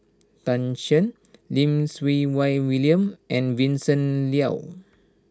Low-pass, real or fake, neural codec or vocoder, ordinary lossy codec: none; real; none; none